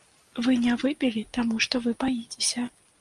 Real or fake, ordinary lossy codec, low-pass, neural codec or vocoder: real; Opus, 32 kbps; 10.8 kHz; none